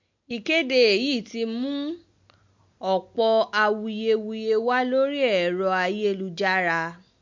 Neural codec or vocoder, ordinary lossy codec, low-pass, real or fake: none; MP3, 48 kbps; 7.2 kHz; real